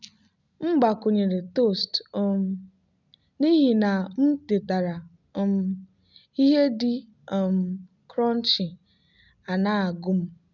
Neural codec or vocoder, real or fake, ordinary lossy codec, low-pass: none; real; none; 7.2 kHz